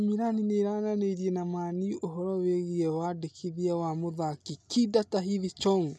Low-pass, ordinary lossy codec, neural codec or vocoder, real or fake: none; none; none; real